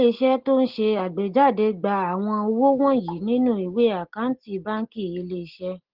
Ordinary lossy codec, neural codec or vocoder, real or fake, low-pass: Opus, 16 kbps; none; real; 5.4 kHz